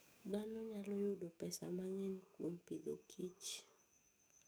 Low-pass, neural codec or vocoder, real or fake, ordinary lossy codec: none; codec, 44.1 kHz, 7.8 kbps, DAC; fake; none